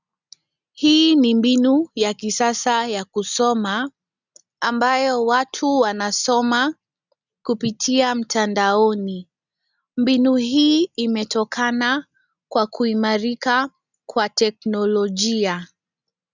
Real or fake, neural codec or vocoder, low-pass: real; none; 7.2 kHz